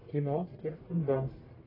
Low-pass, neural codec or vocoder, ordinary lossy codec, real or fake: 5.4 kHz; codec, 44.1 kHz, 1.7 kbps, Pupu-Codec; AAC, 24 kbps; fake